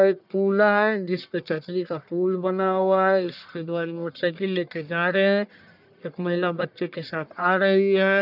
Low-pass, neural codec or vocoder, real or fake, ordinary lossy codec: 5.4 kHz; codec, 44.1 kHz, 1.7 kbps, Pupu-Codec; fake; none